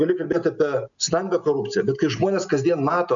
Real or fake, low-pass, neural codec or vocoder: real; 7.2 kHz; none